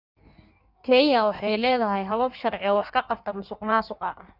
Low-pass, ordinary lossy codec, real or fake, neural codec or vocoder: 5.4 kHz; none; fake; codec, 16 kHz in and 24 kHz out, 1.1 kbps, FireRedTTS-2 codec